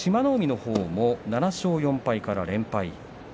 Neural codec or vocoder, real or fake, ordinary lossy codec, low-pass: none; real; none; none